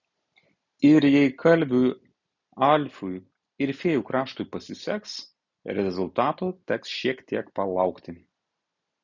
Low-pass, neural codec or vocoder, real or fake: 7.2 kHz; none; real